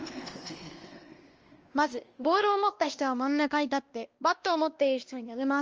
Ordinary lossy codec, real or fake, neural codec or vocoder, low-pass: Opus, 24 kbps; fake; codec, 16 kHz, 1 kbps, X-Codec, WavLM features, trained on Multilingual LibriSpeech; 7.2 kHz